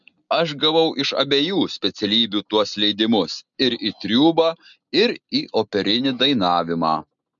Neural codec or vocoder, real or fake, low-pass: none; real; 7.2 kHz